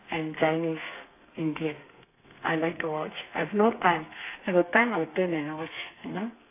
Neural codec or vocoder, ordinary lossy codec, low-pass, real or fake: codec, 32 kHz, 1.9 kbps, SNAC; AAC, 24 kbps; 3.6 kHz; fake